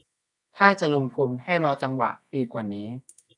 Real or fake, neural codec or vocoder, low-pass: fake; codec, 24 kHz, 0.9 kbps, WavTokenizer, medium music audio release; 10.8 kHz